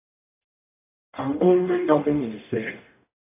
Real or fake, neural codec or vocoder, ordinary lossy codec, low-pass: fake; codec, 44.1 kHz, 0.9 kbps, DAC; AAC, 32 kbps; 3.6 kHz